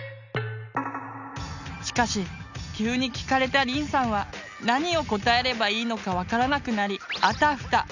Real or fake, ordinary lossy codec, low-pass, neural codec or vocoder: real; none; 7.2 kHz; none